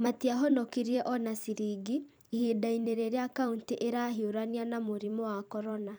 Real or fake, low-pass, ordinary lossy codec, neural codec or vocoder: fake; none; none; vocoder, 44.1 kHz, 128 mel bands every 256 samples, BigVGAN v2